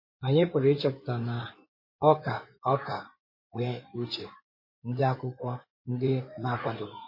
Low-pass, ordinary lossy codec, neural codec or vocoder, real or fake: 5.4 kHz; MP3, 24 kbps; codec, 16 kHz in and 24 kHz out, 2.2 kbps, FireRedTTS-2 codec; fake